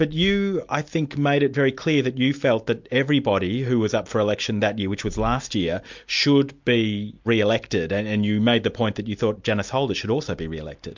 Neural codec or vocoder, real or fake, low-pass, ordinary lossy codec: none; real; 7.2 kHz; MP3, 64 kbps